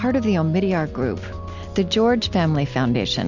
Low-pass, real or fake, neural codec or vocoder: 7.2 kHz; real; none